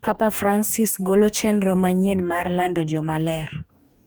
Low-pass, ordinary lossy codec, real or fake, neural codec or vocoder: none; none; fake; codec, 44.1 kHz, 2.6 kbps, DAC